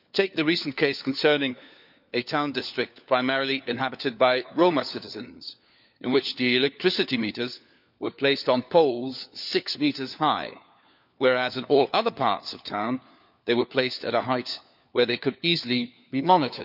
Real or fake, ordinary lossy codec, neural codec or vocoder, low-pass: fake; none; codec, 16 kHz, 4 kbps, FunCodec, trained on LibriTTS, 50 frames a second; 5.4 kHz